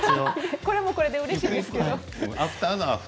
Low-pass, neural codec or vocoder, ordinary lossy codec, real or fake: none; none; none; real